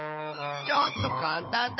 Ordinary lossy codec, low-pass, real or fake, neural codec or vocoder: MP3, 24 kbps; 7.2 kHz; fake; codec, 16 kHz, 16 kbps, FunCodec, trained on Chinese and English, 50 frames a second